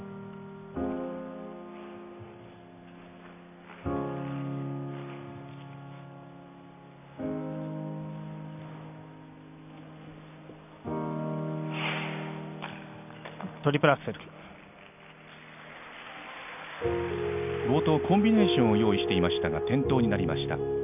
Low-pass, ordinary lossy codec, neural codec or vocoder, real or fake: 3.6 kHz; none; none; real